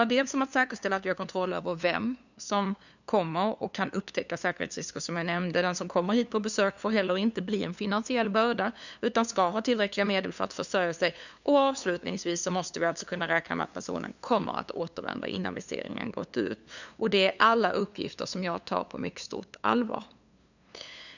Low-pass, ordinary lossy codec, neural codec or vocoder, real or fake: 7.2 kHz; none; codec, 16 kHz, 2 kbps, FunCodec, trained on LibriTTS, 25 frames a second; fake